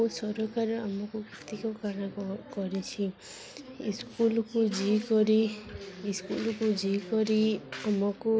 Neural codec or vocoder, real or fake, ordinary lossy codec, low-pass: none; real; none; none